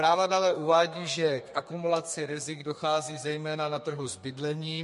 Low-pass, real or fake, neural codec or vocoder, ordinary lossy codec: 14.4 kHz; fake; codec, 32 kHz, 1.9 kbps, SNAC; MP3, 48 kbps